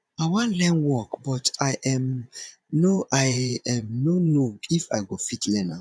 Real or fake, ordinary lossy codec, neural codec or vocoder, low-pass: fake; none; vocoder, 22.05 kHz, 80 mel bands, Vocos; none